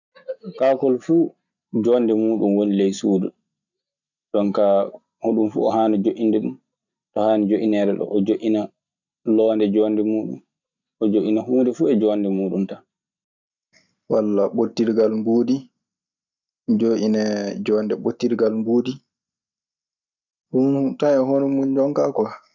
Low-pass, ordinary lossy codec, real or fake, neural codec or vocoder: 7.2 kHz; none; real; none